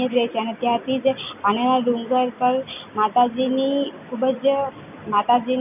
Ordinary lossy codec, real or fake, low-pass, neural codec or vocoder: none; real; 3.6 kHz; none